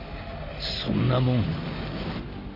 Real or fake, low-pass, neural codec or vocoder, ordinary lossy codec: real; 5.4 kHz; none; none